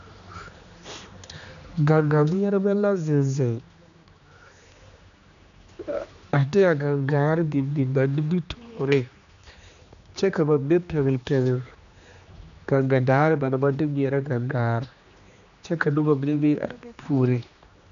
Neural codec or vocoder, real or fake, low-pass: codec, 16 kHz, 2 kbps, X-Codec, HuBERT features, trained on general audio; fake; 7.2 kHz